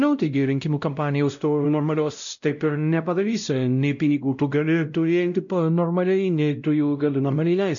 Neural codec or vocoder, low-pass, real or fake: codec, 16 kHz, 0.5 kbps, X-Codec, WavLM features, trained on Multilingual LibriSpeech; 7.2 kHz; fake